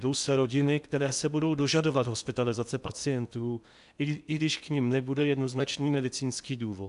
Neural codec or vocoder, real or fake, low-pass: codec, 16 kHz in and 24 kHz out, 0.8 kbps, FocalCodec, streaming, 65536 codes; fake; 10.8 kHz